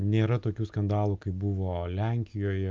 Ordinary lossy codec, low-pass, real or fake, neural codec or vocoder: Opus, 24 kbps; 7.2 kHz; real; none